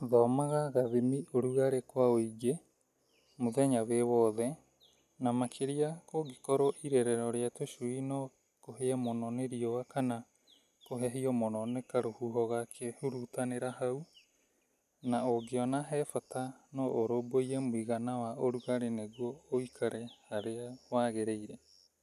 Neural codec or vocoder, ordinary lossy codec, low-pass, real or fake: none; none; 14.4 kHz; real